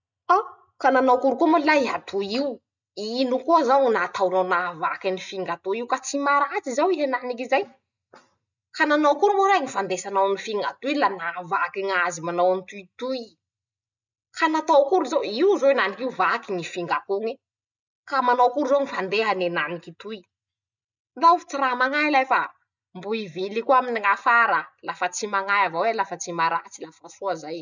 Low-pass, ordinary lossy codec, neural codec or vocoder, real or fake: 7.2 kHz; none; none; real